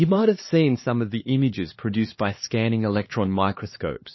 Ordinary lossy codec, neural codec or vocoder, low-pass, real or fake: MP3, 24 kbps; codec, 16 kHz, 2 kbps, X-Codec, HuBERT features, trained on LibriSpeech; 7.2 kHz; fake